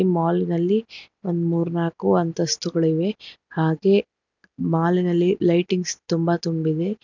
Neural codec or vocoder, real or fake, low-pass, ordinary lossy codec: none; real; 7.2 kHz; none